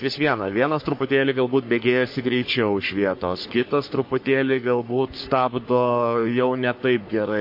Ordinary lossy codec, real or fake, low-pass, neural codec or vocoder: MP3, 32 kbps; fake; 5.4 kHz; codec, 44.1 kHz, 3.4 kbps, Pupu-Codec